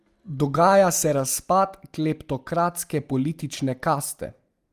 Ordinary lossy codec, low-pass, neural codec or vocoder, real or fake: Opus, 32 kbps; 14.4 kHz; none; real